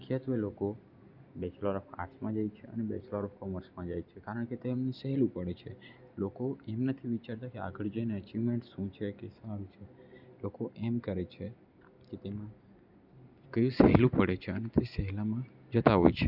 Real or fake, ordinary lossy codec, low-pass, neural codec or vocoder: real; none; 5.4 kHz; none